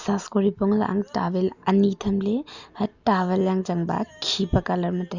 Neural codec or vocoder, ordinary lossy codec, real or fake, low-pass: none; Opus, 64 kbps; real; 7.2 kHz